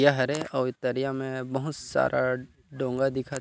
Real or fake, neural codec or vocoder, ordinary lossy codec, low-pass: real; none; none; none